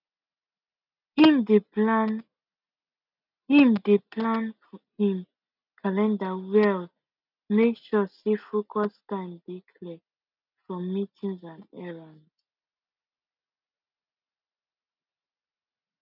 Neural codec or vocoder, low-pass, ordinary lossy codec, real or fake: none; 5.4 kHz; none; real